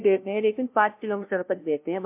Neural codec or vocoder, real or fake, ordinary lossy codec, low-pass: codec, 16 kHz, 0.5 kbps, FunCodec, trained on LibriTTS, 25 frames a second; fake; MP3, 32 kbps; 3.6 kHz